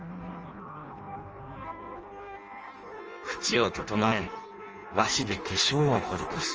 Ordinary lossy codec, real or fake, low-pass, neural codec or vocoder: Opus, 24 kbps; fake; 7.2 kHz; codec, 16 kHz in and 24 kHz out, 0.6 kbps, FireRedTTS-2 codec